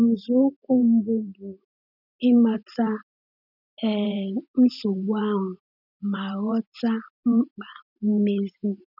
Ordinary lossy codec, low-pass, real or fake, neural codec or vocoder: none; 5.4 kHz; fake; vocoder, 44.1 kHz, 128 mel bands every 512 samples, BigVGAN v2